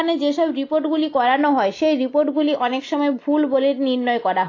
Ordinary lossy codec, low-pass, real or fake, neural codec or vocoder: AAC, 32 kbps; 7.2 kHz; real; none